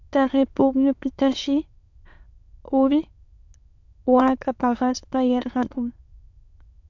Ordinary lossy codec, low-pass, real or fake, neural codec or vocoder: MP3, 64 kbps; 7.2 kHz; fake; autoencoder, 22.05 kHz, a latent of 192 numbers a frame, VITS, trained on many speakers